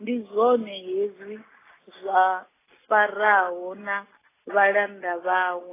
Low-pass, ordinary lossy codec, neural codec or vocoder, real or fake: 3.6 kHz; AAC, 16 kbps; none; real